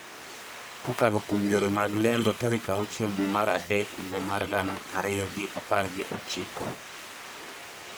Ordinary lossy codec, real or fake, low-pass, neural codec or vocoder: none; fake; none; codec, 44.1 kHz, 1.7 kbps, Pupu-Codec